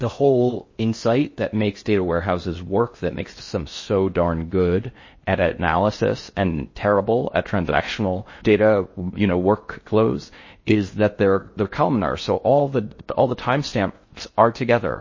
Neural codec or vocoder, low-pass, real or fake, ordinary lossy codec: codec, 16 kHz in and 24 kHz out, 0.6 kbps, FocalCodec, streaming, 4096 codes; 7.2 kHz; fake; MP3, 32 kbps